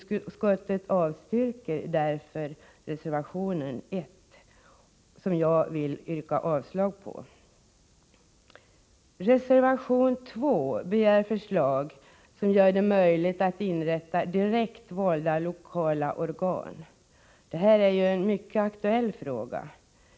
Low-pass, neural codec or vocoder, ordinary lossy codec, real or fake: none; none; none; real